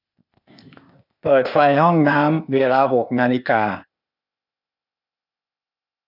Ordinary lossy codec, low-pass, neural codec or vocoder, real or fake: none; 5.4 kHz; codec, 16 kHz, 0.8 kbps, ZipCodec; fake